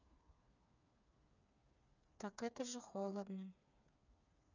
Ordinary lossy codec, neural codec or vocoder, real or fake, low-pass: none; codec, 16 kHz, 4 kbps, FreqCodec, smaller model; fake; 7.2 kHz